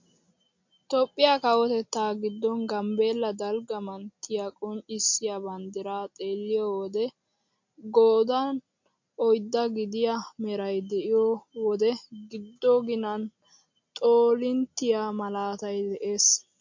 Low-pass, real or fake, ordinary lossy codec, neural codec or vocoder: 7.2 kHz; real; MP3, 48 kbps; none